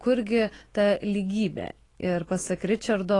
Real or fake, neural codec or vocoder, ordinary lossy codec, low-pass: fake; autoencoder, 48 kHz, 128 numbers a frame, DAC-VAE, trained on Japanese speech; AAC, 32 kbps; 10.8 kHz